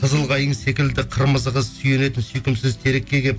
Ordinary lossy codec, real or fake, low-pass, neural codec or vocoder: none; real; none; none